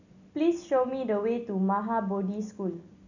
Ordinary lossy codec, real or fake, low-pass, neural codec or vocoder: none; real; 7.2 kHz; none